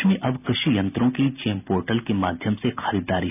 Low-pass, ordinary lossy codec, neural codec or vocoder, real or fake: 3.6 kHz; none; none; real